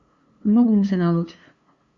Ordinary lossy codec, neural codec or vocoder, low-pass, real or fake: Opus, 64 kbps; codec, 16 kHz, 2 kbps, FunCodec, trained on LibriTTS, 25 frames a second; 7.2 kHz; fake